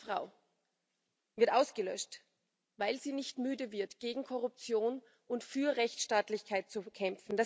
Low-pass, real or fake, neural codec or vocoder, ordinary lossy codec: none; real; none; none